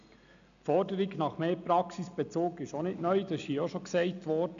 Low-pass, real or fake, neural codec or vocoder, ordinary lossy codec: 7.2 kHz; real; none; none